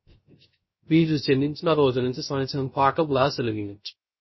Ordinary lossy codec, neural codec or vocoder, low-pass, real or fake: MP3, 24 kbps; codec, 16 kHz, 0.3 kbps, FocalCodec; 7.2 kHz; fake